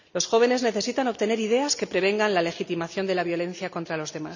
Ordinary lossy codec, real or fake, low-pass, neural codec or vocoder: none; real; 7.2 kHz; none